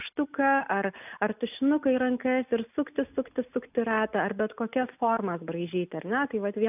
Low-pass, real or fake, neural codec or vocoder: 3.6 kHz; real; none